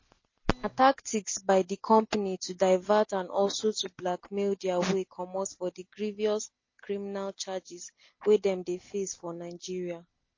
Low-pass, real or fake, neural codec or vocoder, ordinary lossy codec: 7.2 kHz; real; none; MP3, 32 kbps